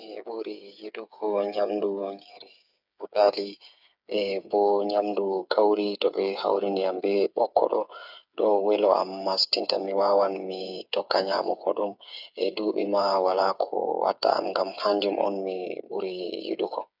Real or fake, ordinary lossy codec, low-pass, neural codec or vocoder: fake; none; 5.4 kHz; codec, 16 kHz, 8 kbps, FreqCodec, smaller model